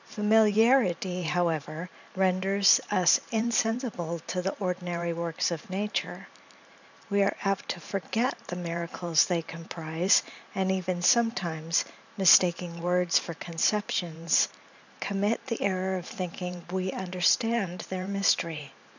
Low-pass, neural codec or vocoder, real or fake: 7.2 kHz; vocoder, 44.1 kHz, 128 mel bands every 512 samples, BigVGAN v2; fake